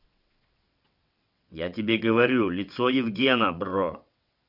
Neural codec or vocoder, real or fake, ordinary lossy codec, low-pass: vocoder, 22.05 kHz, 80 mel bands, WaveNeXt; fake; none; 5.4 kHz